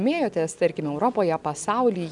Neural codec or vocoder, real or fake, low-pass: none; real; 10.8 kHz